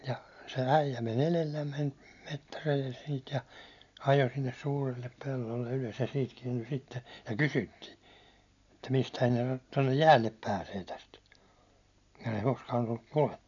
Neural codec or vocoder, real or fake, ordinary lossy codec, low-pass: none; real; none; 7.2 kHz